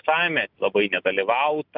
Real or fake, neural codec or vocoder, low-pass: real; none; 5.4 kHz